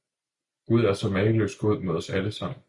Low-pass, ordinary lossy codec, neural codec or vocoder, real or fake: 10.8 kHz; Opus, 64 kbps; none; real